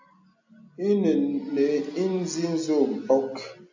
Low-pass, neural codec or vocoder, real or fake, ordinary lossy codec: 7.2 kHz; none; real; AAC, 48 kbps